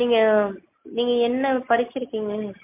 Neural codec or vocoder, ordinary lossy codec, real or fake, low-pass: none; MP3, 24 kbps; real; 3.6 kHz